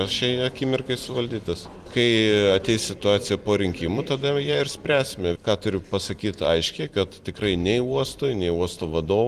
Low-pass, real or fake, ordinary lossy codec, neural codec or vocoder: 14.4 kHz; fake; Opus, 24 kbps; vocoder, 44.1 kHz, 128 mel bands every 256 samples, BigVGAN v2